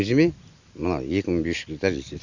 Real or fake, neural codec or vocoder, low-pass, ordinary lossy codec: real; none; 7.2 kHz; Opus, 64 kbps